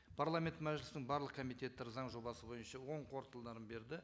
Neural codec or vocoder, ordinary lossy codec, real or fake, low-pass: none; none; real; none